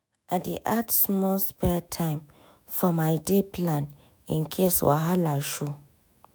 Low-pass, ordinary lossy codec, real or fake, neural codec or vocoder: none; none; fake; autoencoder, 48 kHz, 128 numbers a frame, DAC-VAE, trained on Japanese speech